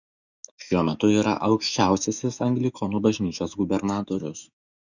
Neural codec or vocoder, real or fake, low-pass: autoencoder, 48 kHz, 128 numbers a frame, DAC-VAE, trained on Japanese speech; fake; 7.2 kHz